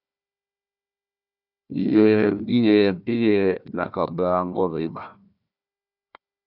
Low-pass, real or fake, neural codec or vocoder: 5.4 kHz; fake; codec, 16 kHz, 1 kbps, FunCodec, trained on Chinese and English, 50 frames a second